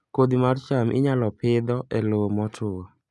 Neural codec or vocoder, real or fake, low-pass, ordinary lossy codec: none; real; none; none